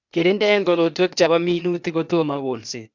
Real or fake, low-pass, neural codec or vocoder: fake; 7.2 kHz; codec, 16 kHz, 0.8 kbps, ZipCodec